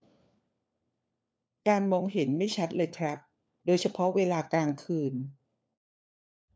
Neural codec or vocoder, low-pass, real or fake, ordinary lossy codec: codec, 16 kHz, 4 kbps, FunCodec, trained on LibriTTS, 50 frames a second; none; fake; none